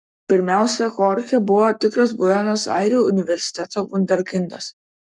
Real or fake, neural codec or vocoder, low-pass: fake; codec, 44.1 kHz, 7.8 kbps, Pupu-Codec; 10.8 kHz